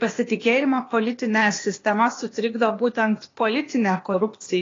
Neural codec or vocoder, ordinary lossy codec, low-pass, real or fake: codec, 16 kHz, 0.8 kbps, ZipCodec; AAC, 32 kbps; 7.2 kHz; fake